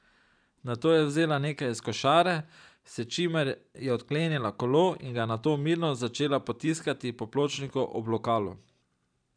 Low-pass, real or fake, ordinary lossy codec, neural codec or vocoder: 9.9 kHz; fake; none; vocoder, 44.1 kHz, 128 mel bands every 512 samples, BigVGAN v2